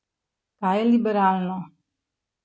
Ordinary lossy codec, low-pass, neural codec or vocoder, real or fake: none; none; none; real